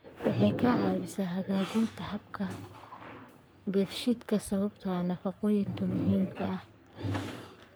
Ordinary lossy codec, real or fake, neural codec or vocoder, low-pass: none; fake; codec, 44.1 kHz, 3.4 kbps, Pupu-Codec; none